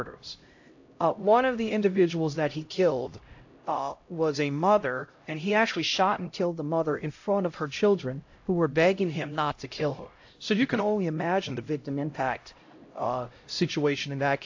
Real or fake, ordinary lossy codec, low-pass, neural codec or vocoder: fake; AAC, 48 kbps; 7.2 kHz; codec, 16 kHz, 0.5 kbps, X-Codec, HuBERT features, trained on LibriSpeech